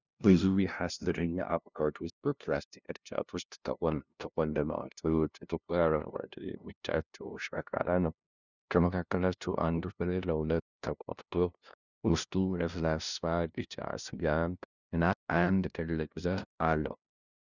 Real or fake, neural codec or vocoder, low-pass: fake; codec, 16 kHz, 0.5 kbps, FunCodec, trained on LibriTTS, 25 frames a second; 7.2 kHz